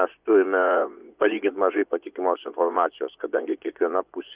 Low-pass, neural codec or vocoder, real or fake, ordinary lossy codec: 3.6 kHz; vocoder, 24 kHz, 100 mel bands, Vocos; fake; Opus, 64 kbps